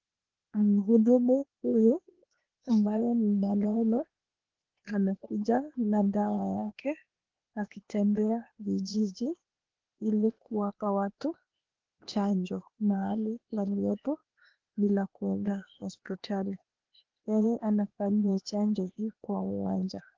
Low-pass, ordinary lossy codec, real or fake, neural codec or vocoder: 7.2 kHz; Opus, 16 kbps; fake; codec, 16 kHz, 0.8 kbps, ZipCodec